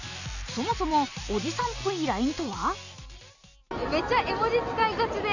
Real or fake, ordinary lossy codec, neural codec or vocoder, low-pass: real; none; none; 7.2 kHz